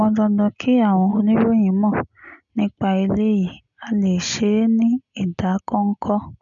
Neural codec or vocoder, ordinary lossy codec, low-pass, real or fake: none; none; 7.2 kHz; real